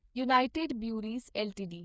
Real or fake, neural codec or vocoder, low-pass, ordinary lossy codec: fake; codec, 16 kHz, 4 kbps, FreqCodec, smaller model; none; none